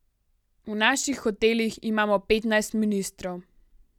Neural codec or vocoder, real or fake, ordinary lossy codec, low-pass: none; real; none; 19.8 kHz